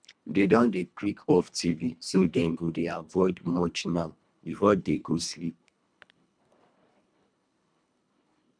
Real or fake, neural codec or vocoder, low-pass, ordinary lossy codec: fake; codec, 24 kHz, 1.5 kbps, HILCodec; 9.9 kHz; none